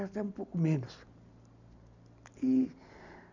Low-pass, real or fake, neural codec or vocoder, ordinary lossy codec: 7.2 kHz; real; none; none